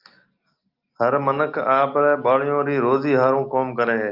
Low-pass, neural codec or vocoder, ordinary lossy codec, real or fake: 5.4 kHz; none; Opus, 24 kbps; real